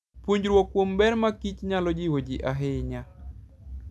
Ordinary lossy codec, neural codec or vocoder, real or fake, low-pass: none; none; real; none